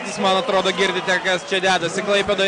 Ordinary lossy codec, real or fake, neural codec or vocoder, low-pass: AAC, 48 kbps; real; none; 9.9 kHz